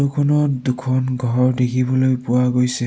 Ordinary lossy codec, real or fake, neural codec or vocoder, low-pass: none; real; none; none